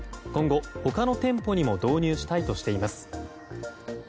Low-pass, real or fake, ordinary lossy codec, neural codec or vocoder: none; real; none; none